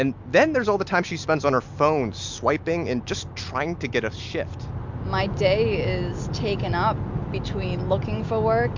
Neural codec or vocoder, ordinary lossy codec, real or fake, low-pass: none; MP3, 64 kbps; real; 7.2 kHz